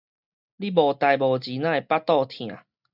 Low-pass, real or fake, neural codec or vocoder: 5.4 kHz; real; none